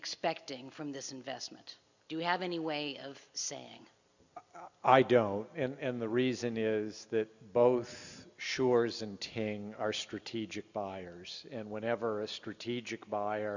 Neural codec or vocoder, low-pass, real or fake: none; 7.2 kHz; real